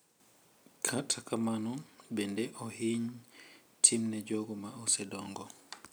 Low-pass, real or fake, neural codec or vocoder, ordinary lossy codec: none; real; none; none